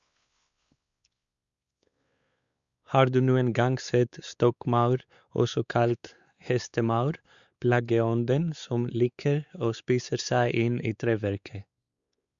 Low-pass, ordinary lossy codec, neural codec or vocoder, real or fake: 7.2 kHz; Opus, 64 kbps; codec, 16 kHz, 4 kbps, X-Codec, WavLM features, trained on Multilingual LibriSpeech; fake